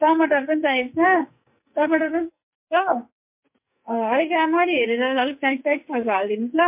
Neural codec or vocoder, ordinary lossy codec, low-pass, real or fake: codec, 44.1 kHz, 2.6 kbps, SNAC; MP3, 32 kbps; 3.6 kHz; fake